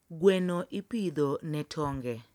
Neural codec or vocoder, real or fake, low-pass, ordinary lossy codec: none; real; 19.8 kHz; none